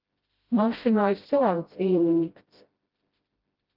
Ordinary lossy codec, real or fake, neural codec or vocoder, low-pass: Opus, 24 kbps; fake; codec, 16 kHz, 0.5 kbps, FreqCodec, smaller model; 5.4 kHz